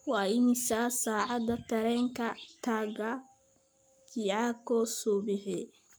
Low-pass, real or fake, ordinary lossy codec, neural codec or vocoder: none; fake; none; codec, 44.1 kHz, 7.8 kbps, Pupu-Codec